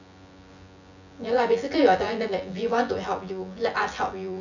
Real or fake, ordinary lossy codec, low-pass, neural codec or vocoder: fake; none; 7.2 kHz; vocoder, 24 kHz, 100 mel bands, Vocos